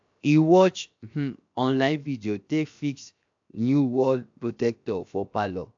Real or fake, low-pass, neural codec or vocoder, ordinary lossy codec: fake; 7.2 kHz; codec, 16 kHz, 0.7 kbps, FocalCodec; AAC, 64 kbps